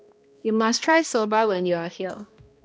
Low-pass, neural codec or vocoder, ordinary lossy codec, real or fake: none; codec, 16 kHz, 1 kbps, X-Codec, HuBERT features, trained on balanced general audio; none; fake